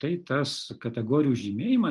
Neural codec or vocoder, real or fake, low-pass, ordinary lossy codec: none; real; 10.8 kHz; Opus, 24 kbps